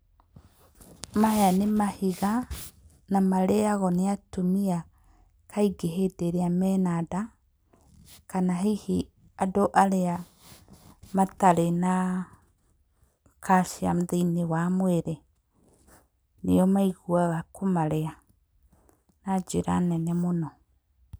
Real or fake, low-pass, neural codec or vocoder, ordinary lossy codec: real; none; none; none